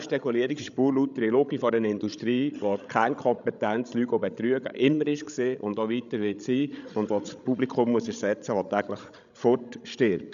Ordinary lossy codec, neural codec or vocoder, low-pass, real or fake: none; codec, 16 kHz, 16 kbps, FreqCodec, larger model; 7.2 kHz; fake